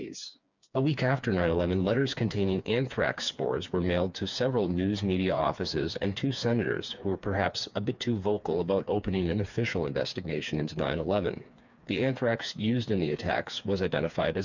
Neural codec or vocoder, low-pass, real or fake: codec, 16 kHz, 4 kbps, FreqCodec, smaller model; 7.2 kHz; fake